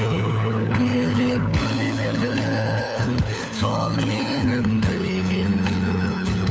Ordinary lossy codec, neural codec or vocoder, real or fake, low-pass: none; codec, 16 kHz, 4 kbps, FunCodec, trained on LibriTTS, 50 frames a second; fake; none